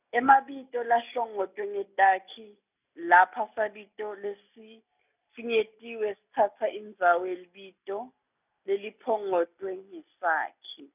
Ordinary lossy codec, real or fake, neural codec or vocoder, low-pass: none; real; none; 3.6 kHz